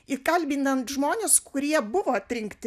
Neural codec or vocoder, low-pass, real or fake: none; 14.4 kHz; real